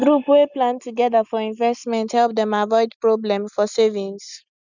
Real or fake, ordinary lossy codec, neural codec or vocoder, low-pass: real; none; none; 7.2 kHz